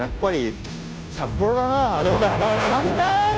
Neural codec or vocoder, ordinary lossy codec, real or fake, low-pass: codec, 16 kHz, 0.5 kbps, FunCodec, trained on Chinese and English, 25 frames a second; none; fake; none